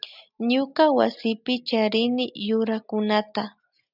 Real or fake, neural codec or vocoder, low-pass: real; none; 5.4 kHz